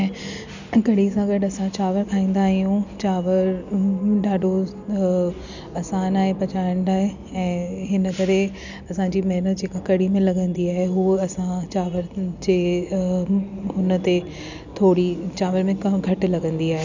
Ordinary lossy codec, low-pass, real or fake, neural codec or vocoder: none; 7.2 kHz; real; none